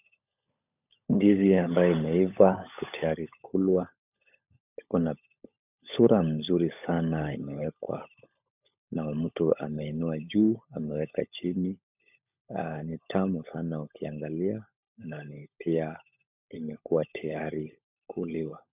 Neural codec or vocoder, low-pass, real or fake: codec, 16 kHz, 16 kbps, FunCodec, trained on LibriTTS, 50 frames a second; 3.6 kHz; fake